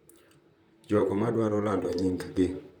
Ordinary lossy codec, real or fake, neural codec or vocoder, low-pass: none; fake; vocoder, 44.1 kHz, 128 mel bands, Pupu-Vocoder; 19.8 kHz